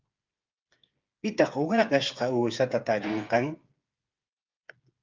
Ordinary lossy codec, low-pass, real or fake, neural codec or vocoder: Opus, 24 kbps; 7.2 kHz; fake; codec, 16 kHz, 8 kbps, FreqCodec, smaller model